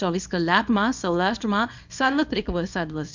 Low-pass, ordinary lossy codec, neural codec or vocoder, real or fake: 7.2 kHz; MP3, 64 kbps; codec, 24 kHz, 0.9 kbps, WavTokenizer, small release; fake